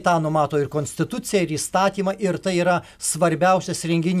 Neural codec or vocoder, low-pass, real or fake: none; 14.4 kHz; real